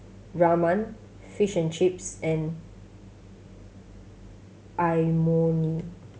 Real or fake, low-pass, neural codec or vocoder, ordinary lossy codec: real; none; none; none